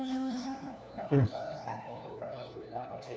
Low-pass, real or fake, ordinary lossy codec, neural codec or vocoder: none; fake; none; codec, 16 kHz, 1 kbps, FunCodec, trained on LibriTTS, 50 frames a second